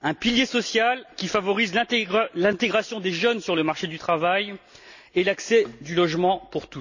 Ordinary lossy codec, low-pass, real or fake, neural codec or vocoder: none; 7.2 kHz; real; none